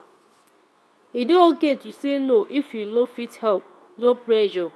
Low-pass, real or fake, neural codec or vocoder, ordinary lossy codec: none; fake; codec, 24 kHz, 0.9 kbps, WavTokenizer, medium speech release version 2; none